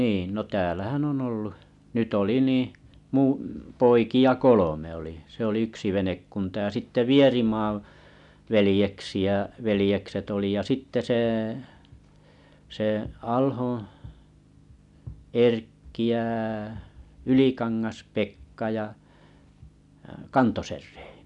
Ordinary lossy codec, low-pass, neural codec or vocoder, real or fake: none; 10.8 kHz; none; real